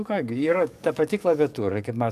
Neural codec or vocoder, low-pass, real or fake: codec, 44.1 kHz, 7.8 kbps, DAC; 14.4 kHz; fake